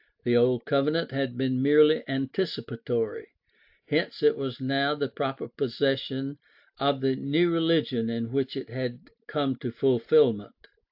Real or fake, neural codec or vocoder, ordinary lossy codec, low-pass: real; none; AAC, 48 kbps; 5.4 kHz